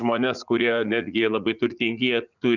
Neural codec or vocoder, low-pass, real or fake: vocoder, 44.1 kHz, 80 mel bands, Vocos; 7.2 kHz; fake